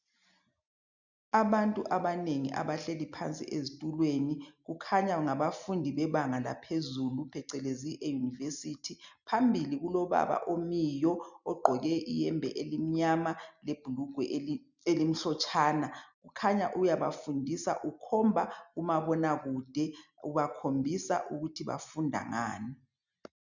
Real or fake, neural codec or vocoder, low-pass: real; none; 7.2 kHz